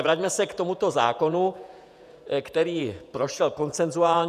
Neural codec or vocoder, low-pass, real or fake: vocoder, 48 kHz, 128 mel bands, Vocos; 14.4 kHz; fake